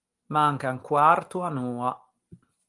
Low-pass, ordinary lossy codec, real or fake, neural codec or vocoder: 10.8 kHz; Opus, 24 kbps; real; none